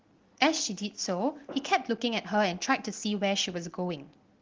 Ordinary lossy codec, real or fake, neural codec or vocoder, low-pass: Opus, 16 kbps; real; none; 7.2 kHz